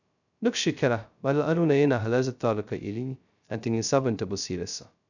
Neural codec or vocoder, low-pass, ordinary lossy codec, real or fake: codec, 16 kHz, 0.2 kbps, FocalCodec; 7.2 kHz; none; fake